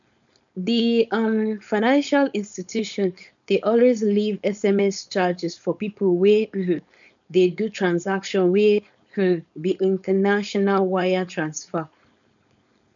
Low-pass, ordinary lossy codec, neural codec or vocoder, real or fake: 7.2 kHz; none; codec, 16 kHz, 4.8 kbps, FACodec; fake